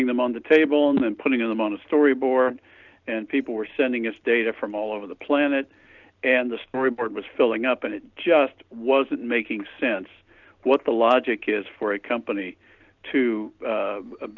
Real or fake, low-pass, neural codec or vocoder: real; 7.2 kHz; none